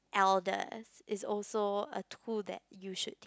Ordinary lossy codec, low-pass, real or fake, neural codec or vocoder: none; none; real; none